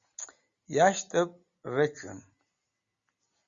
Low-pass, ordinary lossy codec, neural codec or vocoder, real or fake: 7.2 kHz; Opus, 64 kbps; none; real